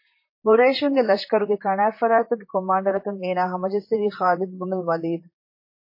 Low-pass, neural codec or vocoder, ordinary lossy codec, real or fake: 5.4 kHz; vocoder, 44.1 kHz, 128 mel bands, Pupu-Vocoder; MP3, 24 kbps; fake